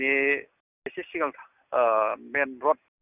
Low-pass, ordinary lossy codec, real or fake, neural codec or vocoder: 3.6 kHz; none; real; none